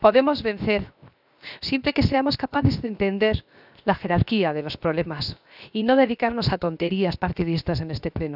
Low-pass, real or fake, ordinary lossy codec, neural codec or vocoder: 5.4 kHz; fake; none; codec, 16 kHz, 0.7 kbps, FocalCodec